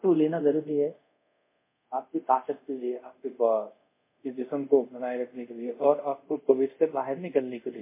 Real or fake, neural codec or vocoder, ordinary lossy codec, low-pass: fake; codec, 24 kHz, 0.5 kbps, DualCodec; MP3, 24 kbps; 3.6 kHz